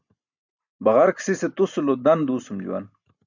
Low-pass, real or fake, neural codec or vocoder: 7.2 kHz; real; none